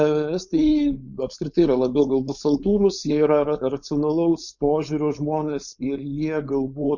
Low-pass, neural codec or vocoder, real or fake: 7.2 kHz; codec, 16 kHz, 4.8 kbps, FACodec; fake